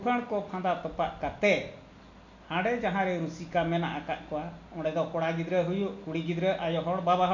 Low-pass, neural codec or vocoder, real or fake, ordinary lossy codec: 7.2 kHz; none; real; none